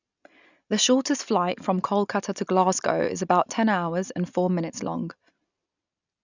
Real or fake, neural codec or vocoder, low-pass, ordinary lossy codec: real; none; 7.2 kHz; none